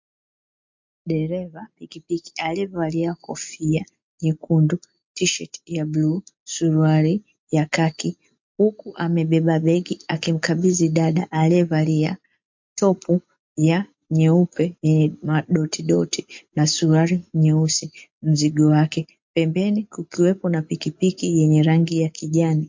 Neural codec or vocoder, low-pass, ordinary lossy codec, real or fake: none; 7.2 kHz; MP3, 48 kbps; real